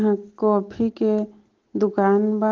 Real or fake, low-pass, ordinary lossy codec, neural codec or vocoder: real; 7.2 kHz; Opus, 16 kbps; none